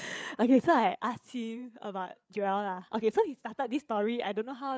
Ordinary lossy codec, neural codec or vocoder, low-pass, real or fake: none; codec, 16 kHz, 8 kbps, FreqCodec, larger model; none; fake